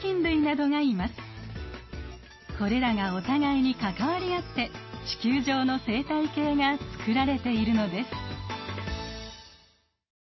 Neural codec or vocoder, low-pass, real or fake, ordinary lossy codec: none; 7.2 kHz; real; MP3, 24 kbps